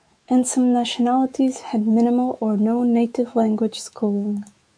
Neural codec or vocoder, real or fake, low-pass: autoencoder, 48 kHz, 128 numbers a frame, DAC-VAE, trained on Japanese speech; fake; 9.9 kHz